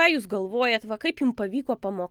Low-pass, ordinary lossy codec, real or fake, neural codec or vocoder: 19.8 kHz; Opus, 24 kbps; real; none